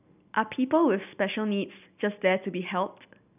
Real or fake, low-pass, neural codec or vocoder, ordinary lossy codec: real; 3.6 kHz; none; none